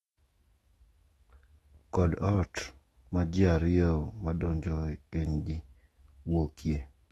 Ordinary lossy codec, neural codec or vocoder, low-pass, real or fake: AAC, 32 kbps; autoencoder, 48 kHz, 128 numbers a frame, DAC-VAE, trained on Japanese speech; 19.8 kHz; fake